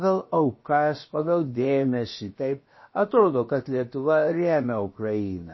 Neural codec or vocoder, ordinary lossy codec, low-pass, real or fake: codec, 16 kHz, about 1 kbps, DyCAST, with the encoder's durations; MP3, 24 kbps; 7.2 kHz; fake